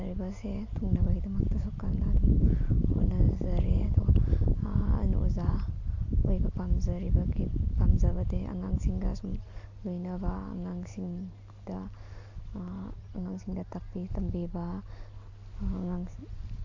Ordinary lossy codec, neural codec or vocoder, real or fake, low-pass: AAC, 48 kbps; none; real; 7.2 kHz